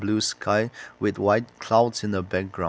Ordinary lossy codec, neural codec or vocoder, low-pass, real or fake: none; none; none; real